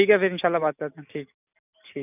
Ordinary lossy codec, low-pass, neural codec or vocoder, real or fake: none; 3.6 kHz; none; real